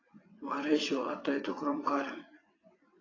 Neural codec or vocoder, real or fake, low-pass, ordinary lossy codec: vocoder, 22.05 kHz, 80 mel bands, WaveNeXt; fake; 7.2 kHz; AAC, 32 kbps